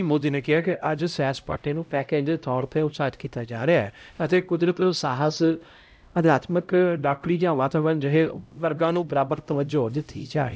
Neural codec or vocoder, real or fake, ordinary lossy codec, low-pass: codec, 16 kHz, 0.5 kbps, X-Codec, HuBERT features, trained on LibriSpeech; fake; none; none